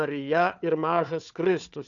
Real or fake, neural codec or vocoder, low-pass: fake; codec, 16 kHz, 4 kbps, FunCodec, trained on Chinese and English, 50 frames a second; 7.2 kHz